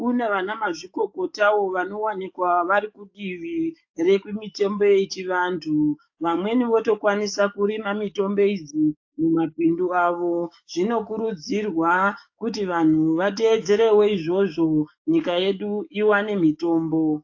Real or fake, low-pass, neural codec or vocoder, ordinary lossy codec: fake; 7.2 kHz; codec, 44.1 kHz, 7.8 kbps, DAC; AAC, 48 kbps